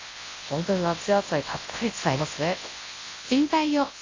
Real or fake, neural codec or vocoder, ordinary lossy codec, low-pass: fake; codec, 24 kHz, 0.9 kbps, WavTokenizer, large speech release; AAC, 32 kbps; 7.2 kHz